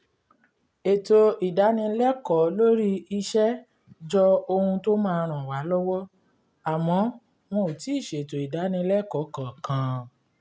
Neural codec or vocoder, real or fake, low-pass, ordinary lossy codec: none; real; none; none